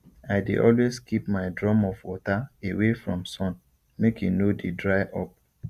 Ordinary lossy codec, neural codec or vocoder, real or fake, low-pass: Opus, 64 kbps; none; real; 14.4 kHz